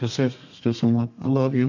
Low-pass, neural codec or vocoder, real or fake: 7.2 kHz; codec, 32 kHz, 1.9 kbps, SNAC; fake